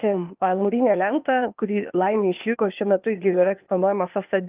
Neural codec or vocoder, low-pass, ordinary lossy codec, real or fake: codec, 16 kHz, 0.8 kbps, ZipCodec; 3.6 kHz; Opus, 32 kbps; fake